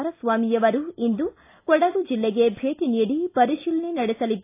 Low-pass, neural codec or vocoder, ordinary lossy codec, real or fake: 3.6 kHz; none; AAC, 24 kbps; real